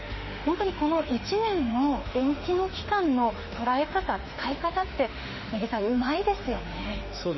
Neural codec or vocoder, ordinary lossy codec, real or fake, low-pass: autoencoder, 48 kHz, 32 numbers a frame, DAC-VAE, trained on Japanese speech; MP3, 24 kbps; fake; 7.2 kHz